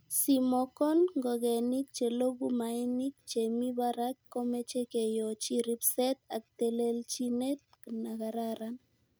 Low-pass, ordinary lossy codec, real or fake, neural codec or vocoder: none; none; real; none